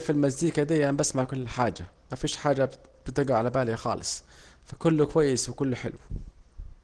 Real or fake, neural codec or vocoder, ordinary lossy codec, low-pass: real; none; Opus, 16 kbps; 9.9 kHz